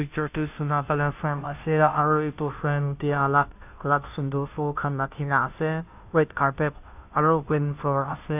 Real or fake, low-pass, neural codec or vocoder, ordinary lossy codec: fake; 3.6 kHz; codec, 16 kHz, 0.5 kbps, FunCodec, trained on Chinese and English, 25 frames a second; AAC, 32 kbps